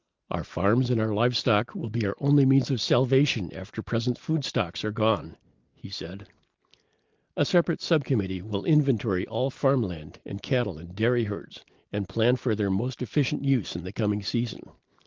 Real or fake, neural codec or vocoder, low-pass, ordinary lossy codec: real; none; 7.2 kHz; Opus, 32 kbps